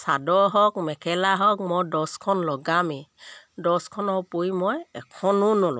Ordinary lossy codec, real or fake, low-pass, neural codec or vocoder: none; real; none; none